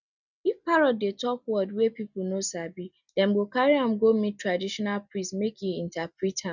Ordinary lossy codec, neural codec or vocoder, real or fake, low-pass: none; none; real; 7.2 kHz